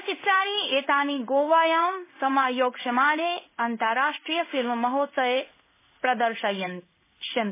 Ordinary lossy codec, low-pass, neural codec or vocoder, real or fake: MP3, 16 kbps; 3.6 kHz; codec, 16 kHz in and 24 kHz out, 1 kbps, XY-Tokenizer; fake